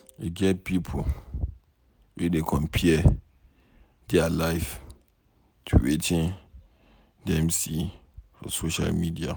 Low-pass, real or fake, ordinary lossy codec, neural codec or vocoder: none; real; none; none